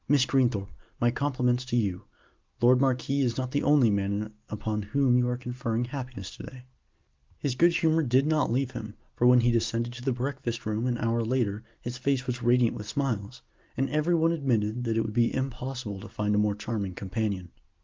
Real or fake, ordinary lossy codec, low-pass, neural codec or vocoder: real; Opus, 32 kbps; 7.2 kHz; none